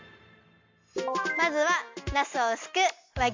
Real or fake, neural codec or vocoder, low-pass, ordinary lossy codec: real; none; 7.2 kHz; MP3, 48 kbps